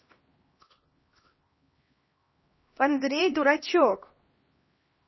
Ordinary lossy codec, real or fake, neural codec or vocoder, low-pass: MP3, 24 kbps; fake; codec, 16 kHz, 1 kbps, X-Codec, HuBERT features, trained on LibriSpeech; 7.2 kHz